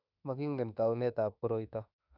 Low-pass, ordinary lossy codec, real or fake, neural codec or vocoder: 5.4 kHz; none; fake; autoencoder, 48 kHz, 32 numbers a frame, DAC-VAE, trained on Japanese speech